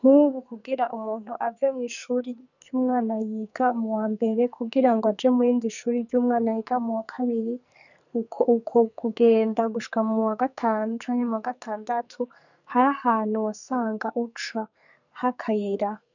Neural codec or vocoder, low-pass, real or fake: codec, 44.1 kHz, 2.6 kbps, SNAC; 7.2 kHz; fake